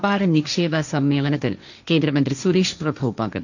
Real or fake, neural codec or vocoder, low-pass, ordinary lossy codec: fake; codec, 16 kHz, 1.1 kbps, Voila-Tokenizer; 7.2 kHz; none